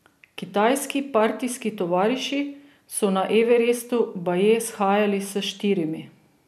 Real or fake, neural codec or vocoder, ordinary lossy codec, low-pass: real; none; none; 14.4 kHz